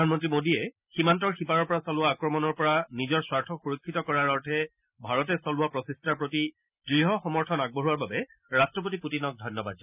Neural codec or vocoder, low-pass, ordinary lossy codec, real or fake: none; 3.6 kHz; none; real